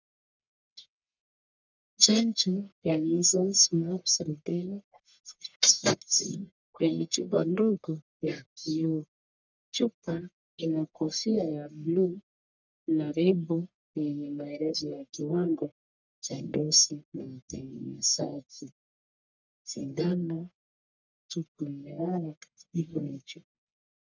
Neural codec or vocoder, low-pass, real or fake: codec, 44.1 kHz, 1.7 kbps, Pupu-Codec; 7.2 kHz; fake